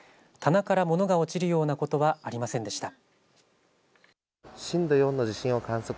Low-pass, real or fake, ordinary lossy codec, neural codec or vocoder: none; real; none; none